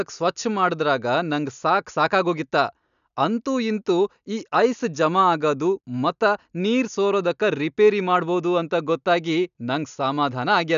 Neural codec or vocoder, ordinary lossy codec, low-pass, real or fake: none; none; 7.2 kHz; real